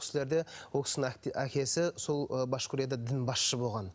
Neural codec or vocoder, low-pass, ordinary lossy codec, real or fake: none; none; none; real